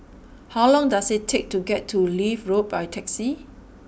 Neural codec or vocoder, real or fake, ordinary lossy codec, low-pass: none; real; none; none